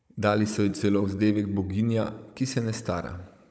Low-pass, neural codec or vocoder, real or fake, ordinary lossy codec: none; codec, 16 kHz, 16 kbps, FunCodec, trained on Chinese and English, 50 frames a second; fake; none